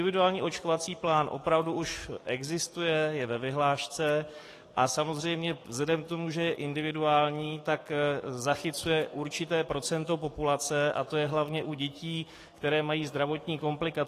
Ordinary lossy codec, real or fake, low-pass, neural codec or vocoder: AAC, 48 kbps; fake; 14.4 kHz; codec, 44.1 kHz, 7.8 kbps, DAC